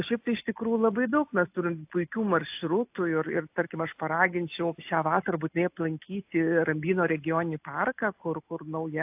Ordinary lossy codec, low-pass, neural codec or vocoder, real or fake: AAC, 32 kbps; 3.6 kHz; none; real